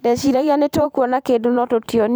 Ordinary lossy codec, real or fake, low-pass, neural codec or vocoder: none; fake; none; vocoder, 44.1 kHz, 128 mel bands every 512 samples, BigVGAN v2